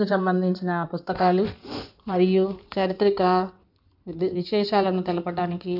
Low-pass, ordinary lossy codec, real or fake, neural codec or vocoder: 5.4 kHz; none; fake; codec, 16 kHz in and 24 kHz out, 2.2 kbps, FireRedTTS-2 codec